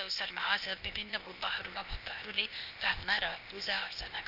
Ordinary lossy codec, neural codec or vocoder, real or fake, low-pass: none; codec, 16 kHz, 0.8 kbps, ZipCodec; fake; 5.4 kHz